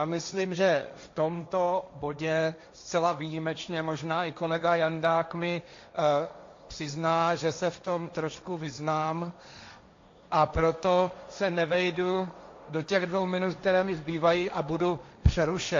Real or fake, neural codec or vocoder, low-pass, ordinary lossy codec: fake; codec, 16 kHz, 1.1 kbps, Voila-Tokenizer; 7.2 kHz; AAC, 48 kbps